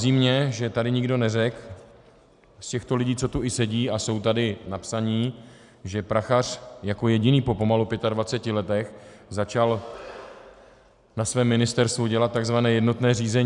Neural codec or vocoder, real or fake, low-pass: none; real; 10.8 kHz